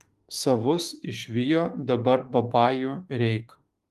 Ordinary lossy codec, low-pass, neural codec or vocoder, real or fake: Opus, 24 kbps; 14.4 kHz; autoencoder, 48 kHz, 32 numbers a frame, DAC-VAE, trained on Japanese speech; fake